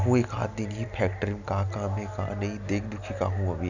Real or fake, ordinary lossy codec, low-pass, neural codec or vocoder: real; none; 7.2 kHz; none